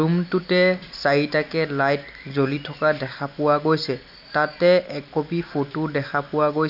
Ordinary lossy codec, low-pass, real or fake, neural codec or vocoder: none; 5.4 kHz; real; none